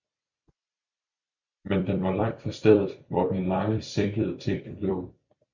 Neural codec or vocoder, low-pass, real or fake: vocoder, 44.1 kHz, 128 mel bands every 256 samples, BigVGAN v2; 7.2 kHz; fake